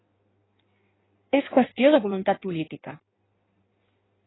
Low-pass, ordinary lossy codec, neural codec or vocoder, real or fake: 7.2 kHz; AAC, 16 kbps; codec, 16 kHz in and 24 kHz out, 1.1 kbps, FireRedTTS-2 codec; fake